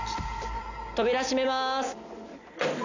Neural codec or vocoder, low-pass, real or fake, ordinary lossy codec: none; 7.2 kHz; real; none